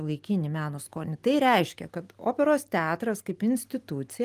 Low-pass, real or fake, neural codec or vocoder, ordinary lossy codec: 14.4 kHz; real; none; Opus, 24 kbps